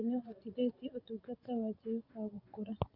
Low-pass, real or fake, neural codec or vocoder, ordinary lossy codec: 5.4 kHz; fake; vocoder, 24 kHz, 100 mel bands, Vocos; Opus, 64 kbps